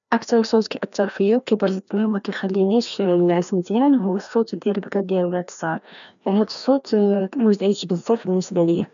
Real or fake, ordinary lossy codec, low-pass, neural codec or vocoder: fake; none; 7.2 kHz; codec, 16 kHz, 1 kbps, FreqCodec, larger model